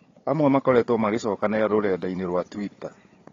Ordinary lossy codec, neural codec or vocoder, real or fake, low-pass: AAC, 32 kbps; codec, 16 kHz, 4 kbps, FunCodec, trained on Chinese and English, 50 frames a second; fake; 7.2 kHz